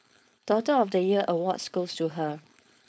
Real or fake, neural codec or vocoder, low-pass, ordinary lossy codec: fake; codec, 16 kHz, 4.8 kbps, FACodec; none; none